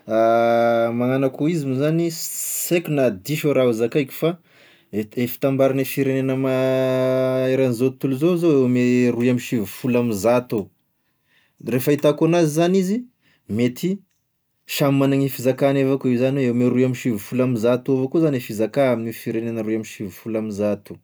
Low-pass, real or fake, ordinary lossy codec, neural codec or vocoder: none; real; none; none